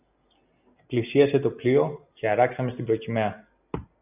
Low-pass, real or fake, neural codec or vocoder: 3.6 kHz; real; none